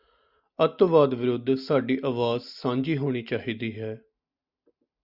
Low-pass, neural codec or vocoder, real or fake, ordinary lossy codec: 5.4 kHz; none; real; Opus, 64 kbps